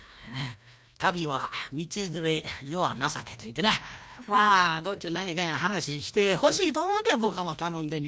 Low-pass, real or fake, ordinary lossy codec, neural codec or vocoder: none; fake; none; codec, 16 kHz, 1 kbps, FreqCodec, larger model